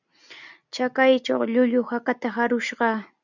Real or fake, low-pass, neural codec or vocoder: real; 7.2 kHz; none